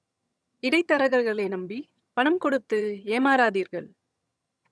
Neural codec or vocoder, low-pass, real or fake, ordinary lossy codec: vocoder, 22.05 kHz, 80 mel bands, HiFi-GAN; none; fake; none